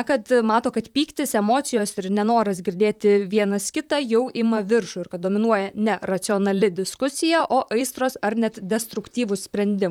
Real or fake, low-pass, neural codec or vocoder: fake; 19.8 kHz; vocoder, 44.1 kHz, 128 mel bands, Pupu-Vocoder